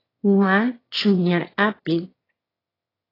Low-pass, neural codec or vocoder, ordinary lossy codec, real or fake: 5.4 kHz; autoencoder, 22.05 kHz, a latent of 192 numbers a frame, VITS, trained on one speaker; AAC, 24 kbps; fake